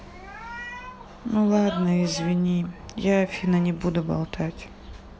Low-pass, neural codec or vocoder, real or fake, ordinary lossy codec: none; none; real; none